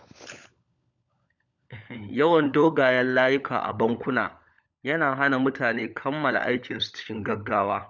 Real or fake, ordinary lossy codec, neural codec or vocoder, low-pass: fake; none; codec, 16 kHz, 16 kbps, FunCodec, trained on LibriTTS, 50 frames a second; 7.2 kHz